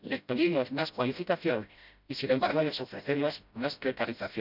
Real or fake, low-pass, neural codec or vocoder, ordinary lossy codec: fake; 5.4 kHz; codec, 16 kHz, 0.5 kbps, FreqCodec, smaller model; none